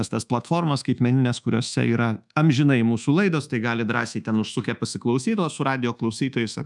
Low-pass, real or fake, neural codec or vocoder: 10.8 kHz; fake; codec, 24 kHz, 1.2 kbps, DualCodec